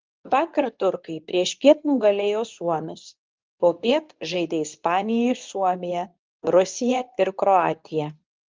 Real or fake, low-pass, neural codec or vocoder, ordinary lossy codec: fake; 7.2 kHz; codec, 24 kHz, 0.9 kbps, WavTokenizer, medium speech release version 2; Opus, 24 kbps